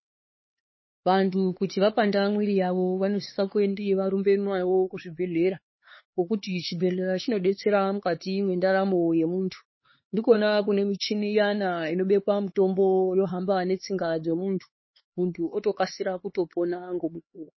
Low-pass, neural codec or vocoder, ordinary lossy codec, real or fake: 7.2 kHz; codec, 16 kHz, 4 kbps, X-Codec, HuBERT features, trained on LibriSpeech; MP3, 24 kbps; fake